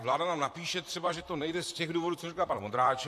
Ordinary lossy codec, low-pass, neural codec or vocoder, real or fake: AAC, 64 kbps; 14.4 kHz; vocoder, 44.1 kHz, 128 mel bands, Pupu-Vocoder; fake